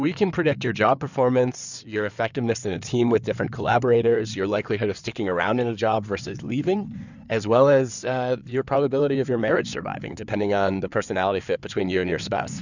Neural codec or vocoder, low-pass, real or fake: codec, 16 kHz in and 24 kHz out, 2.2 kbps, FireRedTTS-2 codec; 7.2 kHz; fake